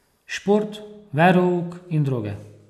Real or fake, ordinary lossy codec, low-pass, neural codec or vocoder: real; none; 14.4 kHz; none